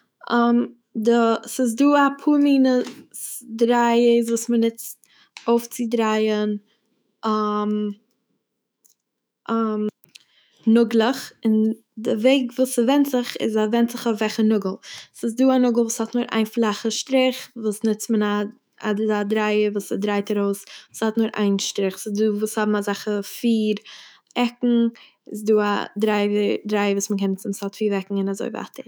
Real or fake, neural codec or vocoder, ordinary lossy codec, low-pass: fake; autoencoder, 48 kHz, 128 numbers a frame, DAC-VAE, trained on Japanese speech; none; none